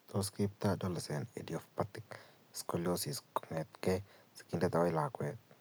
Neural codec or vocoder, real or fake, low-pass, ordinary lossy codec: none; real; none; none